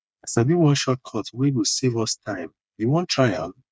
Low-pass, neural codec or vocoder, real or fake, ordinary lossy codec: none; codec, 16 kHz, 4 kbps, FreqCodec, smaller model; fake; none